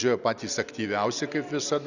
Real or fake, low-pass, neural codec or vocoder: real; 7.2 kHz; none